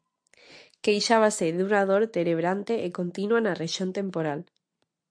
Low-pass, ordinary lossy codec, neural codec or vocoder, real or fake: 9.9 kHz; AAC, 64 kbps; none; real